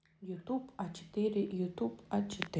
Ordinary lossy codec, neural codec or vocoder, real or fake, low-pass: none; none; real; none